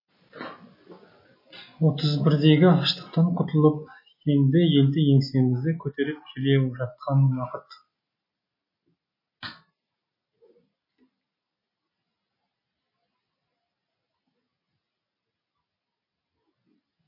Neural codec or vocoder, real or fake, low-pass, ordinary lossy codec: none; real; 5.4 kHz; MP3, 24 kbps